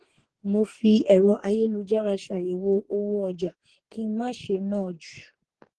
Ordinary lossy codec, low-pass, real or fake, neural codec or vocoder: Opus, 16 kbps; 10.8 kHz; fake; codec, 44.1 kHz, 2.6 kbps, DAC